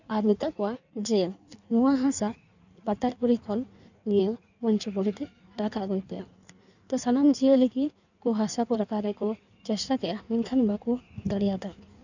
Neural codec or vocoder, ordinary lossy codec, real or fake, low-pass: codec, 16 kHz in and 24 kHz out, 1.1 kbps, FireRedTTS-2 codec; none; fake; 7.2 kHz